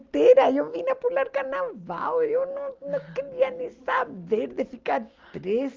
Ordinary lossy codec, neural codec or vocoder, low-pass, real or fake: Opus, 32 kbps; none; 7.2 kHz; real